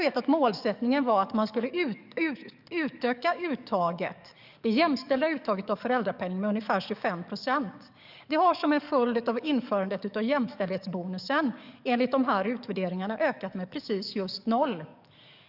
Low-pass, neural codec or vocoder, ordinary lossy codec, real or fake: 5.4 kHz; codec, 44.1 kHz, 7.8 kbps, DAC; none; fake